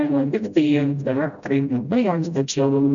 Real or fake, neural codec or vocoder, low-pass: fake; codec, 16 kHz, 0.5 kbps, FreqCodec, smaller model; 7.2 kHz